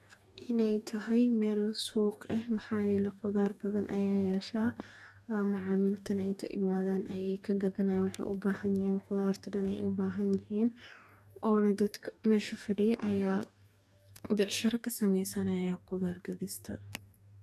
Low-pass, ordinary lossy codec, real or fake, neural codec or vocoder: 14.4 kHz; none; fake; codec, 44.1 kHz, 2.6 kbps, DAC